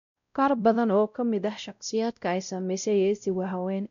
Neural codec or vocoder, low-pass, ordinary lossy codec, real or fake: codec, 16 kHz, 0.5 kbps, X-Codec, WavLM features, trained on Multilingual LibriSpeech; 7.2 kHz; none; fake